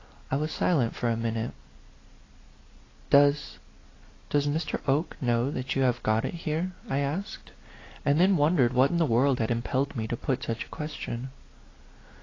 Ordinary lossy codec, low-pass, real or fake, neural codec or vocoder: AAC, 32 kbps; 7.2 kHz; real; none